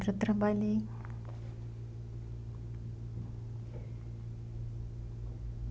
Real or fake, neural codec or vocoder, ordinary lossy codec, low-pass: real; none; none; none